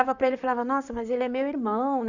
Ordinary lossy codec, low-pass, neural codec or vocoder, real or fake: none; 7.2 kHz; vocoder, 44.1 kHz, 128 mel bands, Pupu-Vocoder; fake